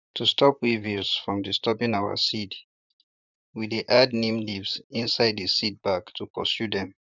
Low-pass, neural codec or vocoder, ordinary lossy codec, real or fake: 7.2 kHz; vocoder, 44.1 kHz, 128 mel bands, Pupu-Vocoder; none; fake